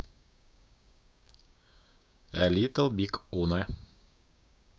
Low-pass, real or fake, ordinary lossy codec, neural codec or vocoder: none; fake; none; codec, 16 kHz, 6 kbps, DAC